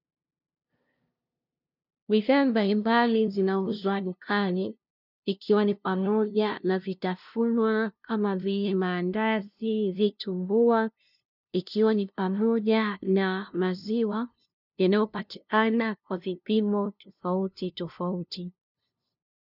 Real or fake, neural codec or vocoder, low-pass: fake; codec, 16 kHz, 0.5 kbps, FunCodec, trained on LibriTTS, 25 frames a second; 5.4 kHz